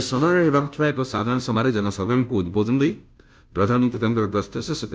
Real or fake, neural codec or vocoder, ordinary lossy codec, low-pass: fake; codec, 16 kHz, 0.5 kbps, FunCodec, trained on Chinese and English, 25 frames a second; none; none